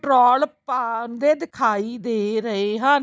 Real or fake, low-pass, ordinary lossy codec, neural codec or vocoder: real; none; none; none